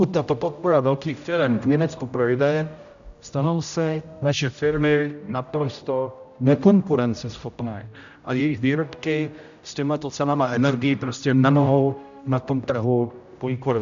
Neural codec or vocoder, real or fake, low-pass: codec, 16 kHz, 0.5 kbps, X-Codec, HuBERT features, trained on general audio; fake; 7.2 kHz